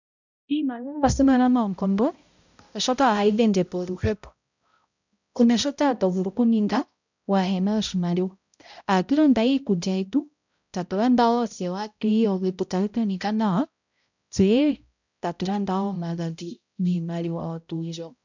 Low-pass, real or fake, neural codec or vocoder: 7.2 kHz; fake; codec, 16 kHz, 0.5 kbps, X-Codec, HuBERT features, trained on balanced general audio